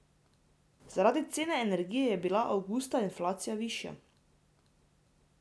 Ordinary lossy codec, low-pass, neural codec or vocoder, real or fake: none; none; none; real